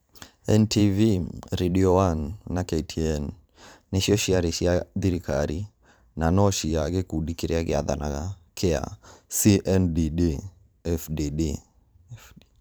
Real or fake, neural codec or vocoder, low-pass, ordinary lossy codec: fake; vocoder, 44.1 kHz, 128 mel bands every 512 samples, BigVGAN v2; none; none